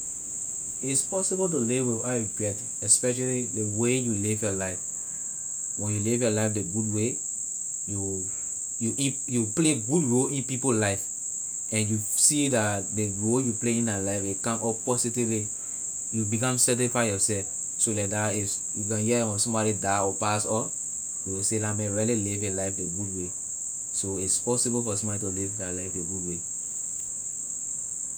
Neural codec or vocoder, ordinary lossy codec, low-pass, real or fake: none; none; none; real